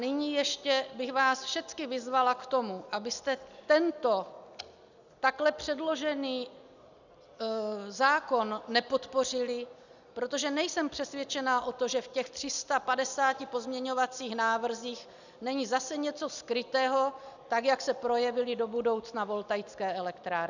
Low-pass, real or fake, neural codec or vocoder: 7.2 kHz; real; none